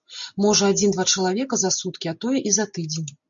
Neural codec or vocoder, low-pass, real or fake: none; 7.2 kHz; real